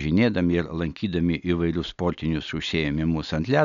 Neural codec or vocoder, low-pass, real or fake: none; 7.2 kHz; real